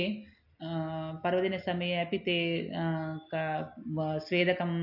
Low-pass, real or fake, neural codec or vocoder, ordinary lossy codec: 5.4 kHz; real; none; AAC, 48 kbps